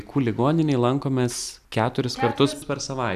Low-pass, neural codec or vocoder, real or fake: 14.4 kHz; none; real